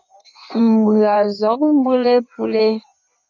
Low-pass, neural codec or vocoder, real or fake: 7.2 kHz; codec, 16 kHz in and 24 kHz out, 1.1 kbps, FireRedTTS-2 codec; fake